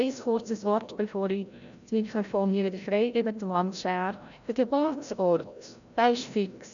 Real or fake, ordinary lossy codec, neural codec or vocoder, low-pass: fake; none; codec, 16 kHz, 0.5 kbps, FreqCodec, larger model; 7.2 kHz